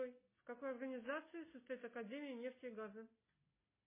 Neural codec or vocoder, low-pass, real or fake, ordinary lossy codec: none; 3.6 kHz; real; MP3, 16 kbps